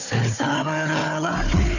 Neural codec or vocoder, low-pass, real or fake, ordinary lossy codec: codec, 16 kHz, 4 kbps, FunCodec, trained on Chinese and English, 50 frames a second; 7.2 kHz; fake; none